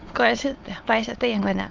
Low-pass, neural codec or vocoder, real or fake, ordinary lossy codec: 7.2 kHz; autoencoder, 22.05 kHz, a latent of 192 numbers a frame, VITS, trained on many speakers; fake; Opus, 32 kbps